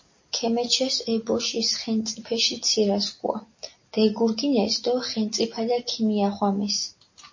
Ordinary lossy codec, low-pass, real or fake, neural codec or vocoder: MP3, 32 kbps; 7.2 kHz; real; none